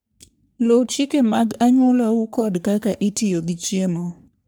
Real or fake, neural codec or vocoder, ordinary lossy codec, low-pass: fake; codec, 44.1 kHz, 3.4 kbps, Pupu-Codec; none; none